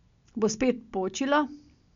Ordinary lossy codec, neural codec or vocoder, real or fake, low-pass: MP3, 64 kbps; none; real; 7.2 kHz